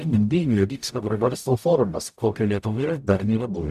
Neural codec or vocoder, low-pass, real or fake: codec, 44.1 kHz, 0.9 kbps, DAC; 14.4 kHz; fake